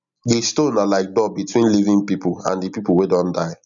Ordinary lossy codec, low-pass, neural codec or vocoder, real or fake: none; 7.2 kHz; none; real